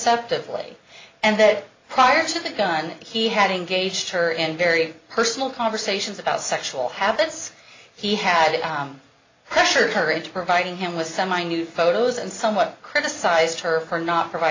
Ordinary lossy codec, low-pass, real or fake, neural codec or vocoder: AAC, 32 kbps; 7.2 kHz; real; none